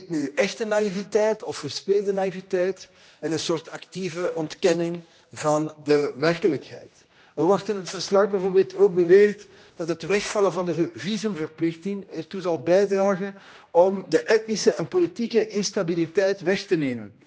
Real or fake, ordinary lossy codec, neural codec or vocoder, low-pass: fake; none; codec, 16 kHz, 1 kbps, X-Codec, HuBERT features, trained on general audio; none